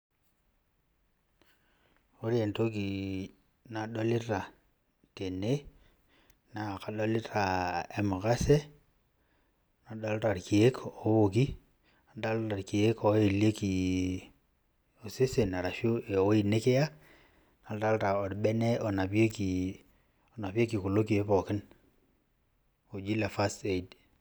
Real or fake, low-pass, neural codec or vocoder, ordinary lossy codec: real; none; none; none